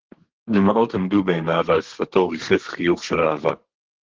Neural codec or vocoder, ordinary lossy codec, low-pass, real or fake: codec, 32 kHz, 1.9 kbps, SNAC; Opus, 16 kbps; 7.2 kHz; fake